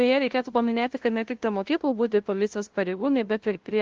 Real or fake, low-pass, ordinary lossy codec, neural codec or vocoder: fake; 7.2 kHz; Opus, 16 kbps; codec, 16 kHz, 0.5 kbps, FunCodec, trained on LibriTTS, 25 frames a second